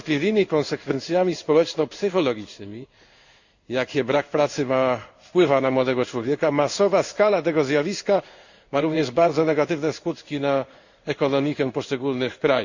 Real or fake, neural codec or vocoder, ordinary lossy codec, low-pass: fake; codec, 16 kHz in and 24 kHz out, 1 kbps, XY-Tokenizer; Opus, 64 kbps; 7.2 kHz